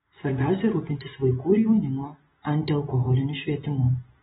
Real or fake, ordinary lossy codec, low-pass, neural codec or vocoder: real; AAC, 16 kbps; 19.8 kHz; none